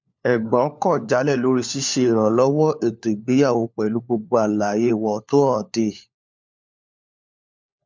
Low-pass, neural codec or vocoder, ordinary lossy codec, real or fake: 7.2 kHz; codec, 16 kHz, 4 kbps, FunCodec, trained on LibriTTS, 50 frames a second; MP3, 64 kbps; fake